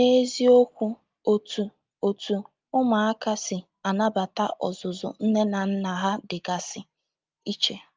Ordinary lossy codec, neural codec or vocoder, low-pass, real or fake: Opus, 24 kbps; none; 7.2 kHz; real